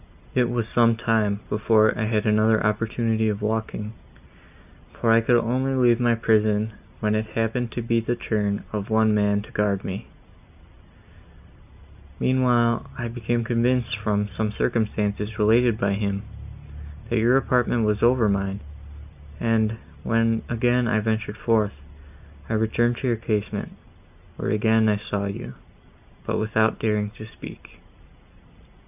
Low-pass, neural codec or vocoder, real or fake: 3.6 kHz; none; real